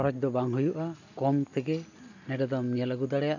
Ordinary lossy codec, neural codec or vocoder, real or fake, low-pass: none; none; real; 7.2 kHz